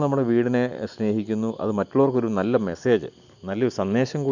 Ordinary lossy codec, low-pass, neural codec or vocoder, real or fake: none; 7.2 kHz; none; real